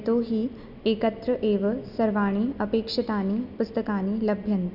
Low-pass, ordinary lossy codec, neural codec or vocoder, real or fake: 5.4 kHz; none; none; real